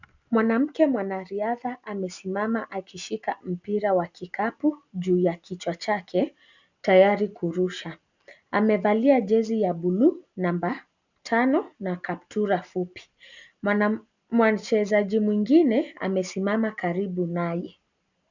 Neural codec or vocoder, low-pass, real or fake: none; 7.2 kHz; real